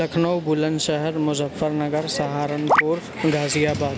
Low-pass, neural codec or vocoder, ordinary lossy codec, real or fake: none; none; none; real